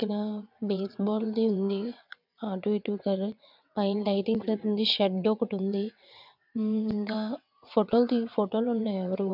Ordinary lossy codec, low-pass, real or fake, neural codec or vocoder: none; 5.4 kHz; fake; vocoder, 22.05 kHz, 80 mel bands, WaveNeXt